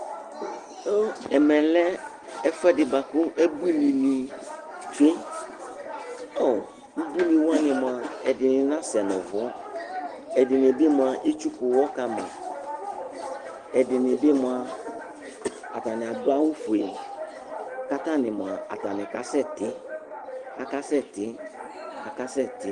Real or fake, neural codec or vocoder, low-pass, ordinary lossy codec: real; none; 10.8 kHz; Opus, 24 kbps